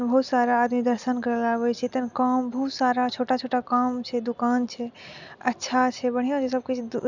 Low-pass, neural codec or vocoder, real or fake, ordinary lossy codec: 7.2 kHz; none; real; none